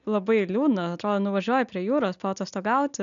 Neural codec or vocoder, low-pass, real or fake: none; 7.2 kHz; real